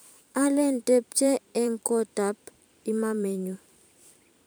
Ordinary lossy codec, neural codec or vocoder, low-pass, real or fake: none; vocoder, 44.1 kHz, 128 mel bands every 512 samples, BigVGAN v2; none; fake